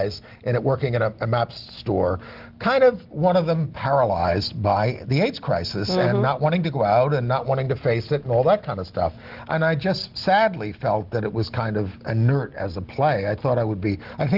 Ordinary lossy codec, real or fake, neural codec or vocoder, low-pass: Opus, 16 kbps; real; none; 5.4 kHz